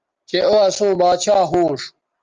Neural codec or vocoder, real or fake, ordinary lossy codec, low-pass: none; real; Opus, 24 kbps; 7.2 kHz